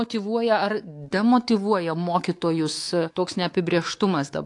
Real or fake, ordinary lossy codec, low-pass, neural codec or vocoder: fake; AAC, 48 kbps; 10.8 kHz; codec, 24 kHz, 3.1 kbps, DualCodec